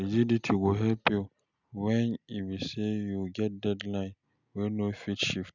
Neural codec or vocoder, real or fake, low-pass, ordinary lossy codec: none; real; 7.2 kHz; none